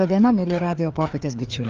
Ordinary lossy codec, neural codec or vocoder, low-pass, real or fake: Opus, 32 kbps; codec, 16 kHz, 2 kbps, FreqCodec, larger model; 7.2 kHz; fake